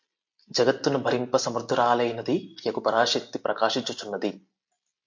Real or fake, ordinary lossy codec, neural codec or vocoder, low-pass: real; MP3, 48 kbps; none; 7.2 kHz